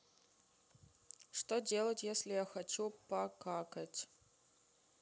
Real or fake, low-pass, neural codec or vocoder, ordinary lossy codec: real; none; none; none